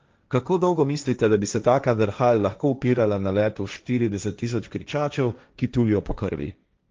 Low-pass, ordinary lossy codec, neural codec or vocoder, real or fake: 7.2 kHz; Opus, 24 kbps; codec, 16 kHz, 1.1 kbps, Voila-Tokenizer; fake